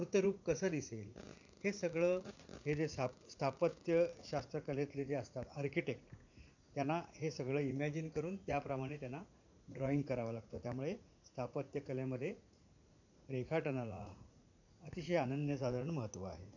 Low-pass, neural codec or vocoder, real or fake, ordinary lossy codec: 7.2 kHz; vocoder, 44.1 kHz, 80 mel bands, Vocos; fake; none